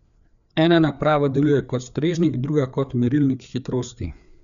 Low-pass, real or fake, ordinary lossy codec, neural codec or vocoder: 7.2 kHz; fake; none; codec, 16 kHz, 4 kbps, FreqCodec, larger model